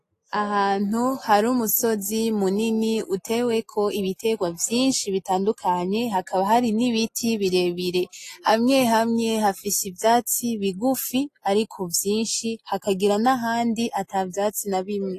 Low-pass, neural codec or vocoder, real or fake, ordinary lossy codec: 14.4 kHz; none; real; AAC, 48 kbps